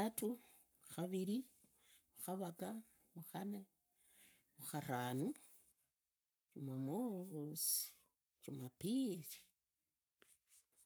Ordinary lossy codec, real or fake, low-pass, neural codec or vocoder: none; fake; none; codec, 44.1 kHz, 7.8 kbps, Pupu-Codec